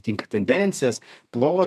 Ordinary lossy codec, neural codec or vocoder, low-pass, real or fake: MP3, 96 kbps; codec, 32 kHz, 1.9 kbps, SNAC; 14.4 kHz; fake